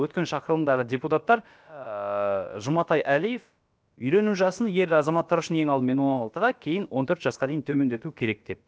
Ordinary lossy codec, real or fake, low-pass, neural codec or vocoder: none; fake; none; codec, 16 kHz, about 1 kbps, DyCAST, with the encoder's durations